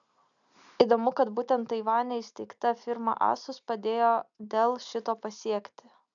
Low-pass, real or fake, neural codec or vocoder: 7.2 kHz; real; none